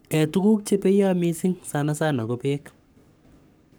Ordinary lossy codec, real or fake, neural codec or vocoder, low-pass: none; fake; codec, 44.1 kHz, 7.8 kbps, Pupu-Codec; none